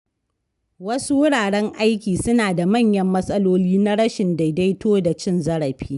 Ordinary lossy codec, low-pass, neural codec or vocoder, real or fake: none; 10.8 kHz; none; real